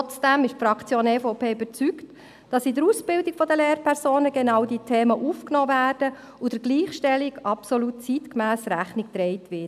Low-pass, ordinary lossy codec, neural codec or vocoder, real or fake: 14.4 kHz; none; none; real